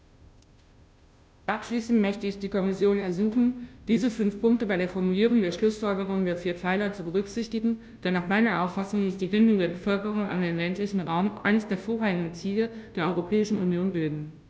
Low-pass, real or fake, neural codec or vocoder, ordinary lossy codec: none; fake; codec, 16 kHz, 0.5 kbps, FunCodec, trained on Chinese and English, 25 frames a second; none